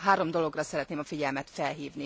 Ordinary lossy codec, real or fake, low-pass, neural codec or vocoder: none; real; none; none